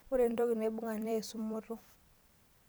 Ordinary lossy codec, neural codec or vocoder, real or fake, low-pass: none; vocoder, 44.1 kHz, 128 mel bands every 256 samples, BigVGAN v2; fake; none